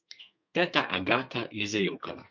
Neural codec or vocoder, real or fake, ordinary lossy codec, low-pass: codec, 32 kHz, 1.9 kbps, SNAC; fake; MP3, 48 kbps; 7.2 kHz